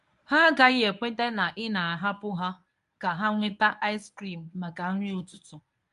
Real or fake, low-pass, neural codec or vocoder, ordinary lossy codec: fake; 10.8 kHz; codec, 24 kHz, 0.9 kbps, WavTokenizer, medium speech release version 1; none